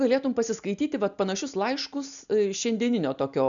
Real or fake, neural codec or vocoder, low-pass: real; none; 7.2 kHz